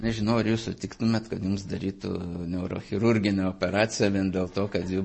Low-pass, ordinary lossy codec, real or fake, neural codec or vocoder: 10.8 kHz; MP3, 32 kbps; real; none